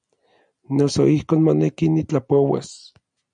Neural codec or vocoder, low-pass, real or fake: none; 9.9 kHz; real